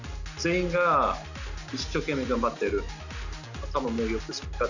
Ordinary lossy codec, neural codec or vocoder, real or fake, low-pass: none; none; real; 7.2 kHz